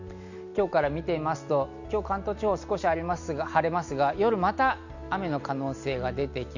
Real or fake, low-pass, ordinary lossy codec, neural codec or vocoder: real; 7.2 kHz; none; none